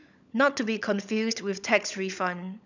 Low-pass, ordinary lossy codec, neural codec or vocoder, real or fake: 7.2 kHz; none; codec, 16 kHz, 16 kbps, FunCodec, trained on LibriTTS, 50 frames a second; fake